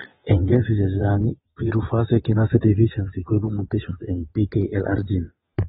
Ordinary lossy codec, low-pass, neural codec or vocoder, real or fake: AAC, 16 kbps; 9.9 kHz; vocoder, 22.05 kHz, 80 mel bands, WaveNeXt; fake